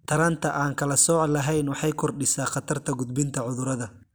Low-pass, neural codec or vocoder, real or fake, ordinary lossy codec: none; none; real; none